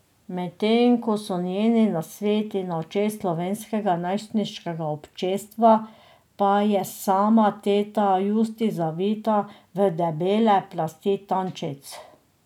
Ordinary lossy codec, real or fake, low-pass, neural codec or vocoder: none; real; 19.8 kHz; none